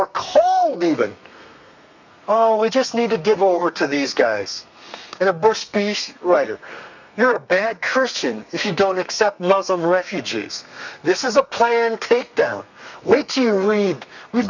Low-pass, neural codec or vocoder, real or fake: 7.2 kHz; codec, 32 kHz, 1.9 kbps, SNAC; fake